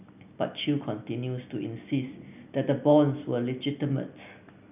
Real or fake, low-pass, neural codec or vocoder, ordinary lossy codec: real; 3.6 kHz; none; none